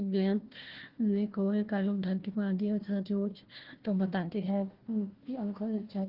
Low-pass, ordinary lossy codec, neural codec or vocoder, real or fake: 5.4 kHz; Opus, 16 kbps; codec, 16 kHz, 1 kbps, FunCodec, trained on Chinese and English, 50 frames a second; fake